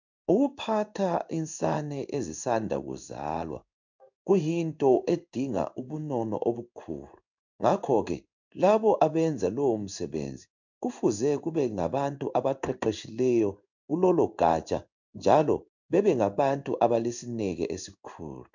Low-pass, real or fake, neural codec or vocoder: 7.2 kHz; fake; codec, 16 kHz in and 24 kHz out, 1 kbps, XY-Tokenizer